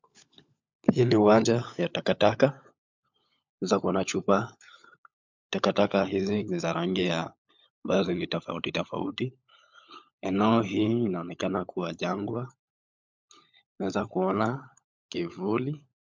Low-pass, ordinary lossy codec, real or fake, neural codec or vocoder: 7.2 kHz; MP3, 64 kbps; fake; codec, 16 kHz, 16 kbps, FunCodec, trained on LibriTTS, 50 frames a second